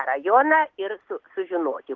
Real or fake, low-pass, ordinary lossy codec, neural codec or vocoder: real; 7.2 kHz; Opus, 16 kbps; none